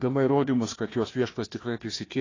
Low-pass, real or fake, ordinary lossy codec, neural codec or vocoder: 7.2 kHz; fake; AAC, 32 kbps; codec, 16 kHz, 1 kbps, FunCodec, trained on Chinese and English, 50 frames a second